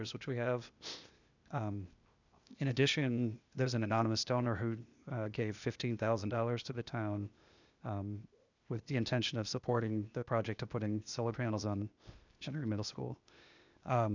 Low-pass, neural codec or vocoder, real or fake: 7.2 kHz; codec, 16 kHz, 0.8 kbps, ZipCodec; fake